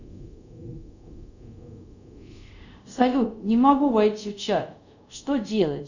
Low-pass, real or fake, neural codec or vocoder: 7.2 kHz; fake; codec, 24 kHz, 0.5 kbps, DualCodec